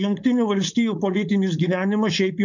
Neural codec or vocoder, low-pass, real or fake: codec, 16 kHz, 4.8 kbps, FACodec; 7.2 kHz; fake